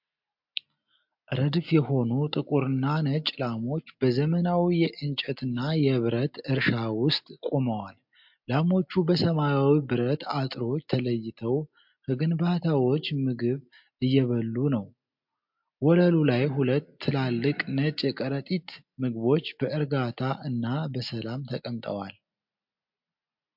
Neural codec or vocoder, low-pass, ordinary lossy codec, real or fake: none; 5.4 kHz; MP3, 48 kbps; real